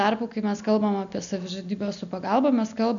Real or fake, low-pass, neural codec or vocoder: real; 7.2 kHz; none